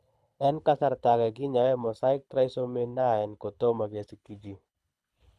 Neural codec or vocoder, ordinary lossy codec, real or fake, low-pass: codec, 24 kHz, 6 kbps, HILCodec; none; fake; none